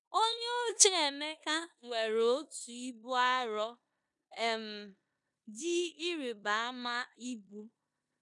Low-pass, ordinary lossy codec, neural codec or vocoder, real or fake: 10.8 kHz; none; codec, 16 kHz in and 24 kHz out, 0.9 kbps, LongCat-Audio-Codec, four codebook decoder; fake